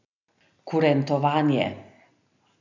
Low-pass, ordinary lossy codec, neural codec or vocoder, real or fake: 7.2 kHz; none; none; real